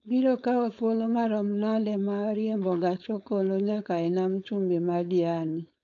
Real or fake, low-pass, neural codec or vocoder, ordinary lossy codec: fake; 7.2 kHz; codec, 16 kHz, 4.8 kbps, FACodec; MP3, 64 kbps